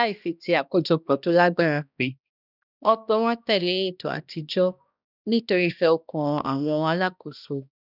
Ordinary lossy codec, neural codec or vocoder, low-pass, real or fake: none; codec, 16 kHz, 1 kbps, X-Codec, HuBERT features, trained on balanced general audio; 5.4 kHz; fake